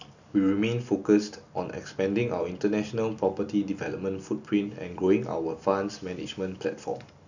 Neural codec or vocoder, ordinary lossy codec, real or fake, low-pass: none; none; real; 7.2 kHz